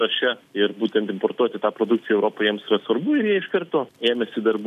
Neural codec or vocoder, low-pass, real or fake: none; 14.4 kHz; real